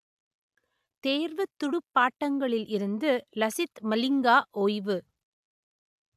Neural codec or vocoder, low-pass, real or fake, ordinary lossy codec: none; 14.4 kHz; real; AAC, 96 kbps